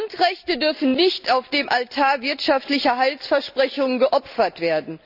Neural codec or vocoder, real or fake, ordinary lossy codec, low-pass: none; real; none; 5.4 kHz